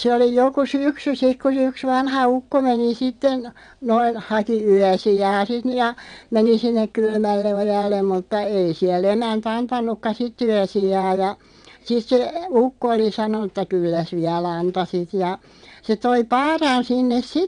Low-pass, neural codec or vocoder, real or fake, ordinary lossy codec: 9.9 kHz; vocoder, 22.05 kHz, 80 mel bands, WaveNeXt; fake; none